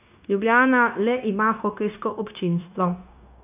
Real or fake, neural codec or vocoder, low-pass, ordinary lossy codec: fake; codec, 16 kHz, 0.9 kbps, LongCat-Audio-Codec; 3.6 kHz; none